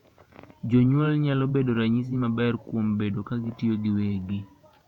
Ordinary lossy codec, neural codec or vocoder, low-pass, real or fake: none; vocoder, 48 kHz, 128 mel bands, Vocos; 19.8 kHz; fake